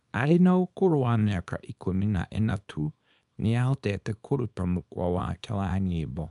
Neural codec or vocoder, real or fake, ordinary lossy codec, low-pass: codec, 24 kHz, 0.9 kbps, WavTokenizer, small release; fake; MP3, 96 kbps; 10.8 kHz